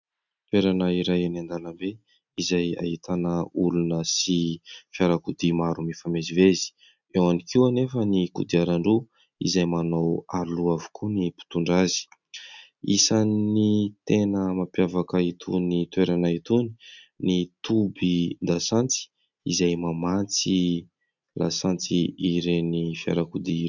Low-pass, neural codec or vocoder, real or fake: 7.2 kHz; none; real